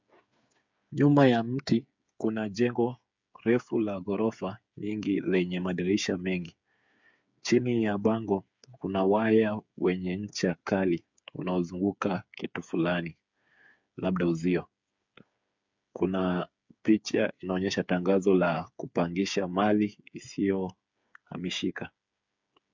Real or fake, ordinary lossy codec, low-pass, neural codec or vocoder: fake; MP3, 64 kbps; 7.2 kHz; codec, 16 kHz, 8 kbps, FreqCodec, smaller model